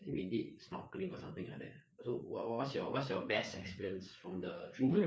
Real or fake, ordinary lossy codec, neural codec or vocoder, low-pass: fake; none; codec, 16 kHz, 4 kbps, FreqCodec, larger model; none